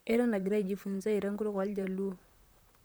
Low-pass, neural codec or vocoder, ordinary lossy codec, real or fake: none; vocoder, 44.1 kHz, 128 mel bands, Pupu-Vocoder; none; fake